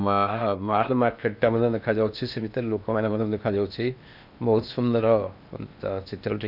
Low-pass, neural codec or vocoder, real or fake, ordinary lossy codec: 5.4 kHz; codec, 16 kHz in and 24 kHz out, 0.8 kbps, FocalCodec, streaming, 65536 codes; fake; none